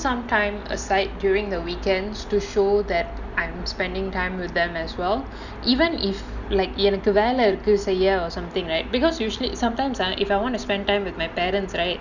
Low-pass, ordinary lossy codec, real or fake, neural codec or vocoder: 7.2 kHz; none; real; none